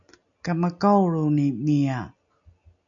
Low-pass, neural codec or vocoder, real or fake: 7.2 kHz; none; real